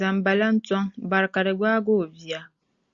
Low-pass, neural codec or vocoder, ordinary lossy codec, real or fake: 7.2 kHz; none; Opus, 64 kbps; real